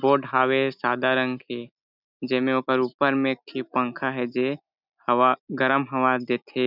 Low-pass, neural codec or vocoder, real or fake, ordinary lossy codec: 5.4 kHz; none; real; none